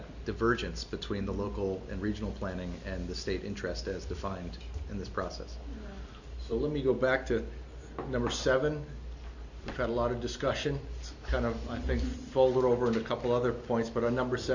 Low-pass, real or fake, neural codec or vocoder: 7.2 kHz; real; none